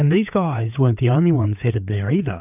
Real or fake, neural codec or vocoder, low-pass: fake; codec, 16 kHz, 4 kbps, FreqCodec, larger model; 3.6 kHz